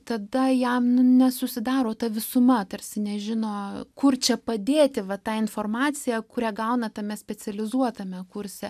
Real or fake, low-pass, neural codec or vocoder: real; 14.4 kHz; none